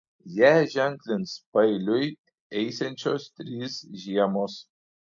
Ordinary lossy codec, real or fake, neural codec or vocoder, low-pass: AAC, 64 kbps; real; none; 7.2 kHz